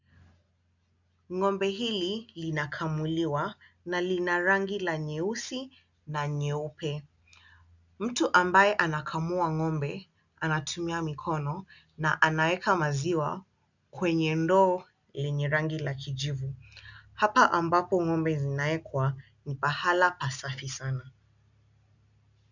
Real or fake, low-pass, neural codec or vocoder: real; 7.2 kHz; none